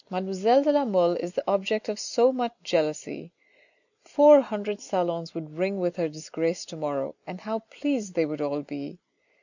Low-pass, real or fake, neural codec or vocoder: 7.2 kHz; real; none